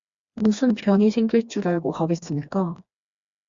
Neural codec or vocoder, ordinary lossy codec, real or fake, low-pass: codec, 16 kHz, 2 kbps, FreqCodec, smaller model; Opus, 64 kbps; fake; 7.2 kHz